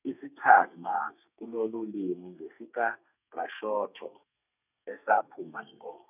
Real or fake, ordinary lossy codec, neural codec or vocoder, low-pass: fake; none; codec, 32 kHz, 1.9 kbps, SNAC; 3.6 kHz